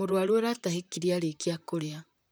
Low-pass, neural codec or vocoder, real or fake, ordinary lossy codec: none; vocoder, 44.1 kHz, 128 mel bands, Pupu-Vocoder; fake; none